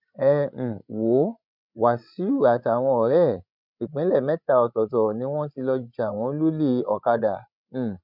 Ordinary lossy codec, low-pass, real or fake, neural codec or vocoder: none; 5.4 kHz; fake; codec, 16 kHz, 16 kbps, FreqCodec, larger model